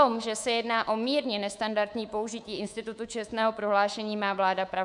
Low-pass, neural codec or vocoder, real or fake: 10.8 kHz; codec, 24 kHz, 3.1 kbps, DualCodec; fake